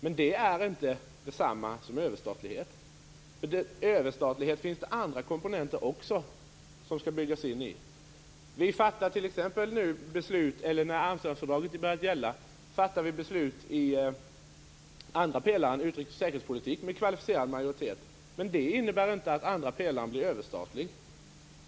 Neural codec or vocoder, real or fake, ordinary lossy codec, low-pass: none; real; none; none